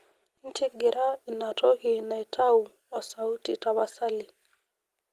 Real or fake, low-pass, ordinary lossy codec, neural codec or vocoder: real; 14.4 kHz; Opus, 24 kbps; none